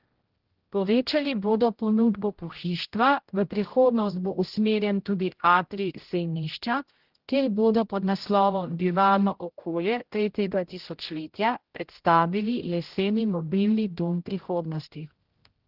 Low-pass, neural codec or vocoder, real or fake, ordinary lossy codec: 5.4 kHz; codec, 16 kHz, 0.5 kbps, X-Codec, HuBERT features, trained on general audio; fake; Opus, 16 kbps